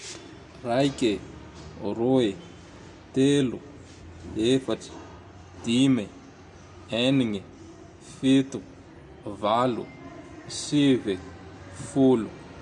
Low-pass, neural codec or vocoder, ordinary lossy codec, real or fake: 10.8 kHz; none; none; real